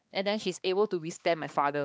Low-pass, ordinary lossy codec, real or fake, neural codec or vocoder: none; none; fake; codec, 16 kHz, 2 kbps, X-Codec, HuBERT features, trained on balanced general audio